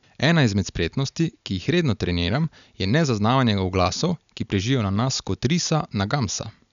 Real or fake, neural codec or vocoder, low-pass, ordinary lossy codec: real; none; 7.2 kHz; none